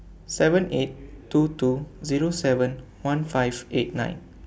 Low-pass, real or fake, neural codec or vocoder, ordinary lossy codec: none; real; none; none